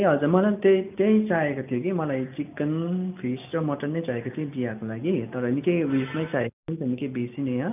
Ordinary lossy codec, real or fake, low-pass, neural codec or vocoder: none; real; 3.6 kHz; none